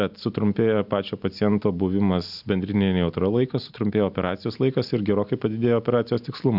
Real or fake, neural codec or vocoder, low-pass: real; none; 5.4 kHz